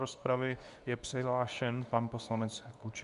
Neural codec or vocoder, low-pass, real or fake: codec, 24 kHz, 0.9 kbps, WavTokenizer, medium speech release version 2; 10.8 kHz; fake